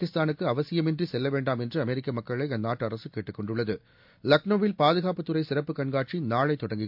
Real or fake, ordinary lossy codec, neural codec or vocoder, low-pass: real; MP3, 48 kbps; none; 5.4 kHz